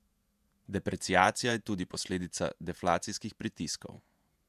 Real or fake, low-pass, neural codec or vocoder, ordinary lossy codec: fake; 14.4 kHz; vocoder, 48 kHz, 128 mel bands, Vocos; MP3, 96 kbps